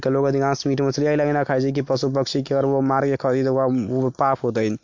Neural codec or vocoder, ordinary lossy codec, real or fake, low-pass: none; MP3, 48 kbps; real; 7.2 kHz